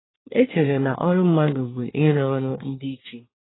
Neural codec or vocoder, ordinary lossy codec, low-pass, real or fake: codec, 24 kHz, 1 kbps, SNAC; AAC, 16 kbps; 7.2 kHz; fake